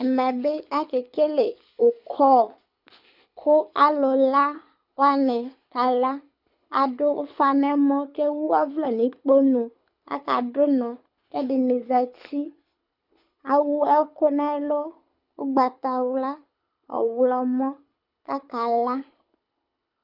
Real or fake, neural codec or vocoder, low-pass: fake; codec, 24 kHz, 6 kbps, HILCodec; 5.4 kHz